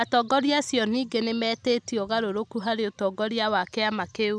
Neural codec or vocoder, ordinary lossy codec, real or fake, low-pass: none; none; real; none